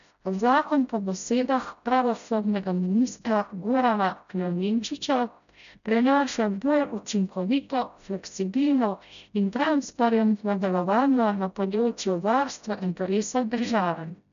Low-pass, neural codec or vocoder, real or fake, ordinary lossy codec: 7.2 kHz; codec, 16 kHz, 0.5 kbps, FreqCodec, smaller model; fake; none